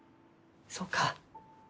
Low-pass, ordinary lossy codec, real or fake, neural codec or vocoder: none; none; real; none